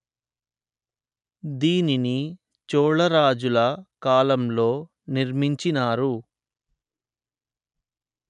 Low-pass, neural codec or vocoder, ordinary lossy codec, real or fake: 10.8 kHz; none; none; real